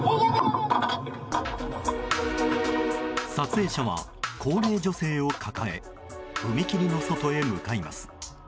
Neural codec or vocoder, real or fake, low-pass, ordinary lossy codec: none; real; none; none